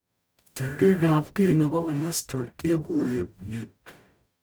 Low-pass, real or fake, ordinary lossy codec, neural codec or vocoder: none; fake; none; codec, 44.1 kHz, 0.9 kbps, DAC